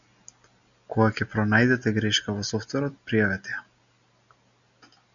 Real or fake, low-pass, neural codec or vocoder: real; 7.2 kHz; none